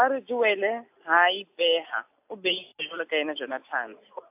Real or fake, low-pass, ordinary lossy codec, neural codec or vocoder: real; 3.6 kHz; AAC, 32 kbps; none